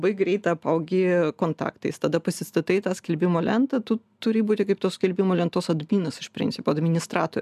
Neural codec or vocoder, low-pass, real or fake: none; 14.4 kHz; real